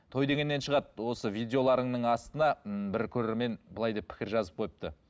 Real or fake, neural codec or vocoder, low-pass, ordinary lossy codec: real; none; none; none